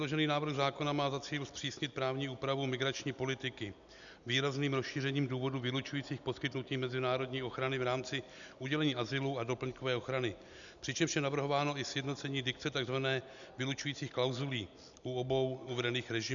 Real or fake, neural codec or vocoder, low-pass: real; none; 7.2 kHz